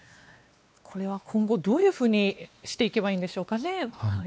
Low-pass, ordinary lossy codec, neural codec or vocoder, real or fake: none; none; codec, 16 kHz, 2 kbps, X-Codec, WavLM features, trained on Multilingual LibriSpeech; fake